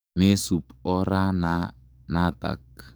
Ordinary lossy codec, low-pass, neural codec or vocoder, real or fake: none; none; codec, 44.1 kHz, 7.8 kbps, DAC; fake